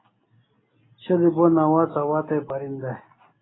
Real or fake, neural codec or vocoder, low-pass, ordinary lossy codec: real; none; 7.2 kHz; AAC, 16 kbps